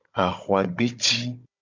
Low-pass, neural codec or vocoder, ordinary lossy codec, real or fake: 7.2 kHz; codec, 16 kHz, 16 kbps, FunCodec, trained on Chinese and English, 50 frames a second; MP3, 64 kbps; fake